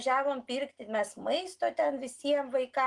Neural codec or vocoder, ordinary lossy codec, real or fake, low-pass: none; Opus, 24 kbps; real; 10.8 kHz